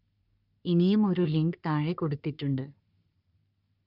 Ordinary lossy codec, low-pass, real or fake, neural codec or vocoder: none; 5.4 kHz; fake; codec, 44.1 kHz, 3.4 kbps, Pupu-Codec